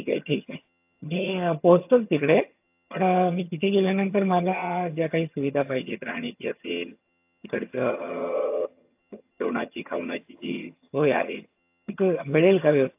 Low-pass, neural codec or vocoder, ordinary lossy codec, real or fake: 3.6 kHz; vocoder, 22.05 kHz, 80 mel bands, HiFi-GAN; AAC, 32 kbps; fake